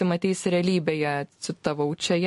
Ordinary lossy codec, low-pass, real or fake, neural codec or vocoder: MP3, 48 kbps; 14.4 kHz; real; none